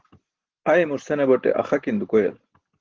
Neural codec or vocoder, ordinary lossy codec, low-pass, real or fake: none; Opus, 16 kbps; 7.2 kHz; real